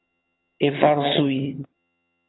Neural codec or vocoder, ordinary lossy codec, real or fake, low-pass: vocoder, 22.05 kHz, 80 mel bands, HiFi-GAN; AAC, 16 kbps; fake; 7.2 kHz